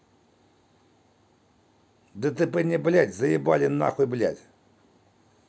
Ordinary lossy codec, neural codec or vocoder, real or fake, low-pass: none; none; real; none